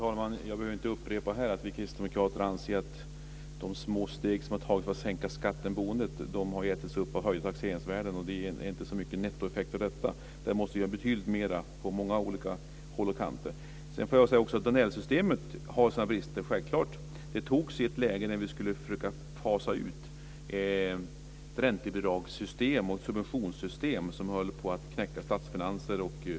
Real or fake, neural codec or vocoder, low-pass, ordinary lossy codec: real; none; none; none